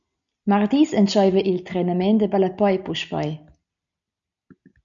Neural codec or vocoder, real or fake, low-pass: none; real; 7.2 kHz